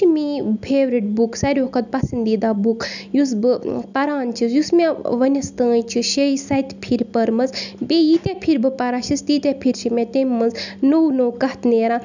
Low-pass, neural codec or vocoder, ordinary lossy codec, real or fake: 7.2 kHz; none; none; real